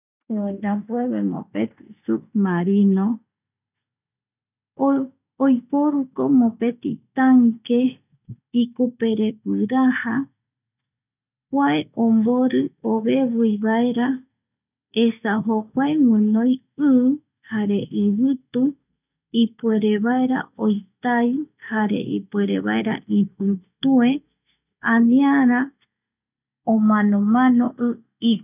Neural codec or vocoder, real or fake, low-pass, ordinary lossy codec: none; real; 3.6 kHz; none